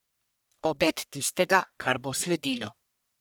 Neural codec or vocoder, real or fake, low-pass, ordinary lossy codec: codec, 44.1 kHz, 1.7 kbps, Pupu-Codec; fake; none; none